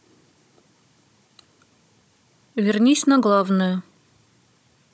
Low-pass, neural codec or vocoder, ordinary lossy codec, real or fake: none; codec, 16 kHz, 16 kbps, FunCodec, trained on Chinese and English, 50 frames a second; none; fake